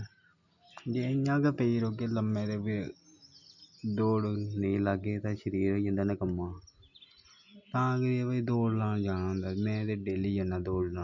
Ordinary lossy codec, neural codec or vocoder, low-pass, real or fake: none; none; 7.2 kHz; real